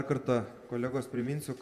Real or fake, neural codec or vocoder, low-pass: fake; vocoder, 48 kHz, 128 mel bands, Vocos; 14.4 kHz